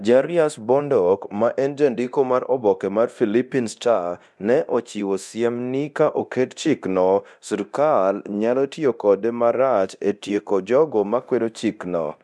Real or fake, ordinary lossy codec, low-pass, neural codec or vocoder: fake; none; none; codec, 24 kHz, 0.9 kbps, DualCodec